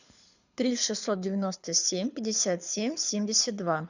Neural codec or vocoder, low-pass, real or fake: codec, 24 kHz, 6 kbps, HILCodec; 7.2 kHz; fake